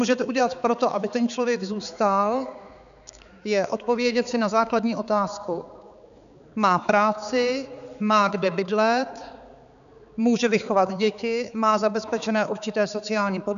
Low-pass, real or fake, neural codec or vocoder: 7.2 kHz; fake; codec, 16 kHz, 4 kbps, X-Codec, HuBERT features, trained on balanced general audio